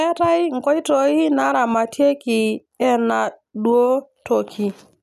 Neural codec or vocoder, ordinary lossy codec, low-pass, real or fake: none; none; 14.4 kHz; real